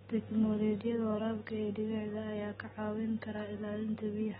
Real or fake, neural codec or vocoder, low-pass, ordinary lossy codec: real; none; 19.8 kHz; AAC, 16 kbps